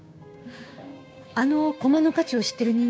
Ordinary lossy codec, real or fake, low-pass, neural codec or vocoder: none; fake; none; codec, 16 kHz, 6 kbps, DAC